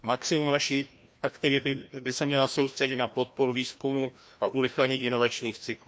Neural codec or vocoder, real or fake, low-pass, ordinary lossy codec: codec, 16 kHz, 1 kbps, FreqCodec, larger model; fake; none; none